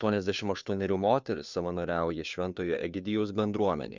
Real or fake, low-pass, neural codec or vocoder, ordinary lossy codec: fake; 7.2 kHz; codec, 16 kHz, 2 kbps, FunCodec, trained on Chinese and English, 25 frames a second; Opus, 64 kbps